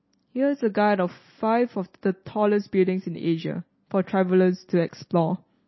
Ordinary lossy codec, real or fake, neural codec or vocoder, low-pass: MP3, 24 kbps; real; none; 7.2 kHz